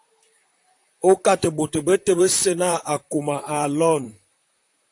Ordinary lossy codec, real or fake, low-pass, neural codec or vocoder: AAC, 64 kbps; fake; 10.8 kHz; vocoder, 44.1 kHz, 128 mel bands, Pupu-Vocoder